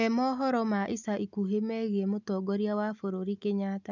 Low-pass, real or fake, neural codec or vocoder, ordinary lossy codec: 7.2 kHz; real; none; none